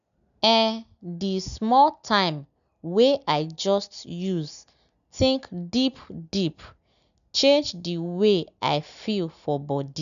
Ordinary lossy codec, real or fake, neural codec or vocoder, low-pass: none; real; none; 7.2 kHz